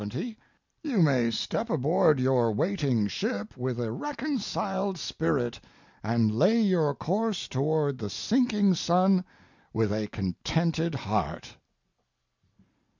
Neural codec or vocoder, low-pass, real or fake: none; 7.2 kHz; real